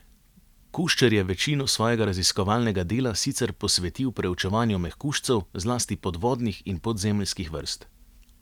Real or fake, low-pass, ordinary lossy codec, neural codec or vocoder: real; 19.8 kHz; none; none